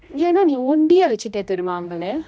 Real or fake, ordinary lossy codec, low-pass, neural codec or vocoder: fake; none; none; codec, 16 kHz, 1 kbps, X-Codec, HuBERT features, trained on general audio